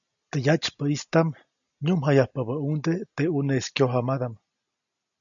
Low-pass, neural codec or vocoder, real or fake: 7.2 kHz; none; real